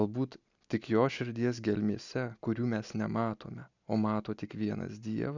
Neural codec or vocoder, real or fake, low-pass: none; real; 7.2 kHz